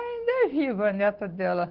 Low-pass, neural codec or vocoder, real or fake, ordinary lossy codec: 5.4 kHz; none; real; Opus, 16 kbps